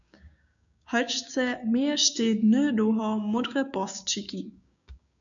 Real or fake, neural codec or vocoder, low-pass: fake; codec, 16 kHz, 6 kbps, DAC; 7.2 kHz